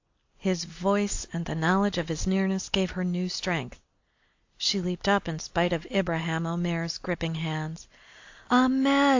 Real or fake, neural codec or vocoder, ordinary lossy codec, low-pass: real; none; AAC, 48 kbps; 7.2 kHz